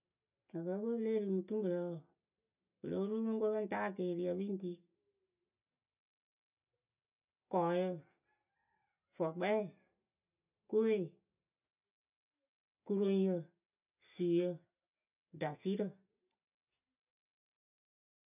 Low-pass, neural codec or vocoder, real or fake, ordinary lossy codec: 3.6 kHz; none; real; none